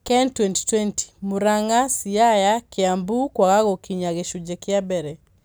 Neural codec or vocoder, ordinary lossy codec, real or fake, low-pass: none; none; real; none